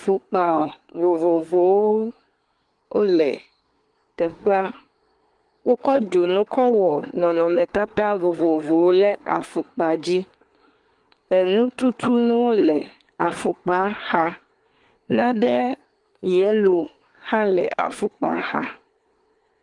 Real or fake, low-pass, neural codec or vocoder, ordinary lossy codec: fake; 10.8 kHz; codec, 24 kHz, 1 kbps, SNAC; Opus, 24 kbps